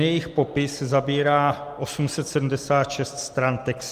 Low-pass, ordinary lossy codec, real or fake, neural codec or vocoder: 14.4 kHz; Opus, 32 kbps; real; none